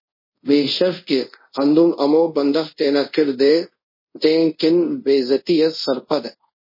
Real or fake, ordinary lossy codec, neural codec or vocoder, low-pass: fake; MP3, 24 kbps; codec, 24 kHz, 0.5 kbps, DualCodec; 5.4 kHz